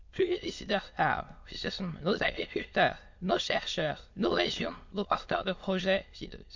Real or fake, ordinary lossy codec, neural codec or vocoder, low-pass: fake; MP3, 48 kbps; autoencoder, 22.05 kHz, a latent of 192 numbers a frame, VITS, trained on many speakers; 7.2 kHz